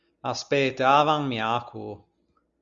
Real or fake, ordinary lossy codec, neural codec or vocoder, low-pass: real; Opus, 64 kbps; none; 7.2 kHz